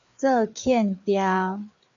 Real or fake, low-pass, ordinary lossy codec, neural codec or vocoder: fake; 7.2 kHz; AAC, 64 kbps; codec, 16 kHz, 4 kbps, FreqCodec, larger model